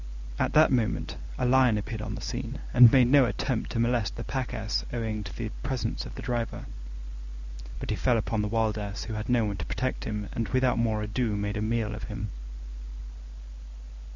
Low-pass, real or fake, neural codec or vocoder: 7.2 kHz; real; none